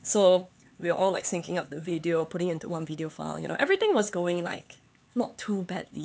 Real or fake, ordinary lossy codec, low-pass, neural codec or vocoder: fake; none; none; codec, 16 kHz, 4 kbps, X-Codec, HuBERT features, trained on LibriSpeech